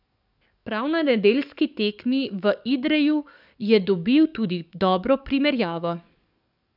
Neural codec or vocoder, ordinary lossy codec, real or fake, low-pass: codec, 44.1 kHz, 7.8 kbps, DAC; none; fake; 5.4 kHz